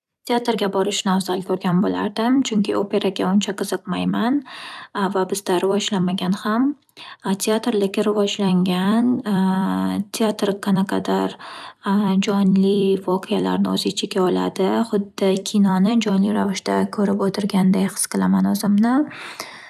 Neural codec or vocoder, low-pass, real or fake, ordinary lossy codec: vocoder, 44.1 kHz, 128 mel bands every 256 samples, BigVGAN v2; 14.4 kHz; fake; none